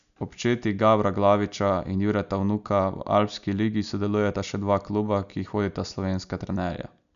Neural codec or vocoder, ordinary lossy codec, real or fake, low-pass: none; none; real; 7.2 kHz